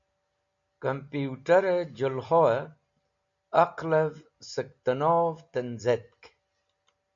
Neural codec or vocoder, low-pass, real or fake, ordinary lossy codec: none; 7.2 kHz; real; MP3, 64 kbps